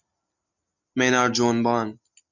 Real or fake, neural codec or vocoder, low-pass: real; none; 7.2 kHz